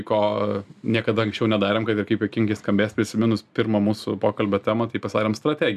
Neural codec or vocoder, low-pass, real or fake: none; 14.4 kHz; real